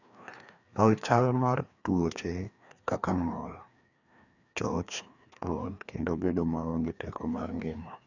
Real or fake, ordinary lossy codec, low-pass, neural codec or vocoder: fake; none; 7.2 kHz; codec, 16 kHz, 2 kbps, FreqCodec, larger model